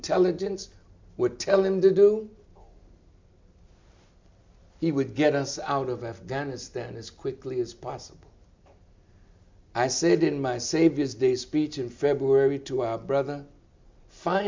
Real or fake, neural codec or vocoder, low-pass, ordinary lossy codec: real; none; 7.2 kHz; MP3, 64 kbps